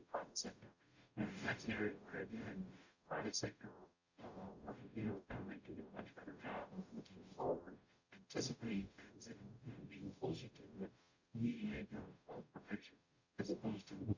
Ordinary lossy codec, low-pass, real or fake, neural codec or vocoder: Opus, 64 kbps; 7.2 kHz; fake; codec, 44.1 kHz, 0.9 kbps, DAC